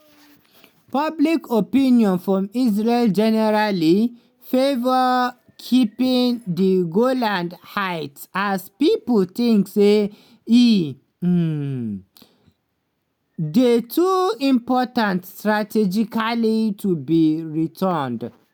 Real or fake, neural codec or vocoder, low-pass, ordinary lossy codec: real; none; none; none